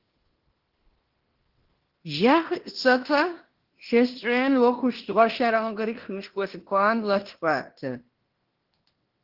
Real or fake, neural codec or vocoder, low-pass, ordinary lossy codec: fake; codec, 16 kHz in and 24 kHz out, 0.9 kbps, LongCat-Audio-Codec, fine tuned four codebook decoder; 5.4 kHz; Opus, 16 kbps